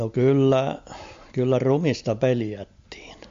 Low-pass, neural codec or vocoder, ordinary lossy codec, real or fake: 7.2 kHz; none; MP3, 48 kbps; real